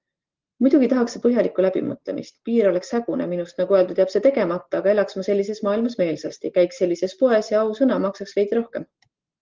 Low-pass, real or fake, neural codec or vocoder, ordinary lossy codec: 7.2 kHz; real; none; Opus, 16 kbps